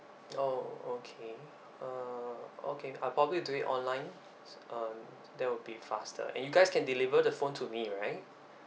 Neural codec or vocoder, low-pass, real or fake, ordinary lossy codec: none; none; real; none